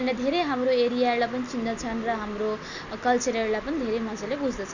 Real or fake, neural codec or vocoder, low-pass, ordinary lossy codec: real; none; 7.2 kHz; none